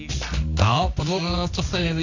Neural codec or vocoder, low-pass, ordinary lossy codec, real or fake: codec, 24 kHz, 0.9 kbps, WavTokenizer, medium music audio release; 7.2 kHz; none; fake